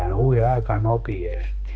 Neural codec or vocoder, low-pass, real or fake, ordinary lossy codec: codec, 16 kHz, 2 kbps, X-Codec, HuBERT features, trained on balanced general audio; none; fake; none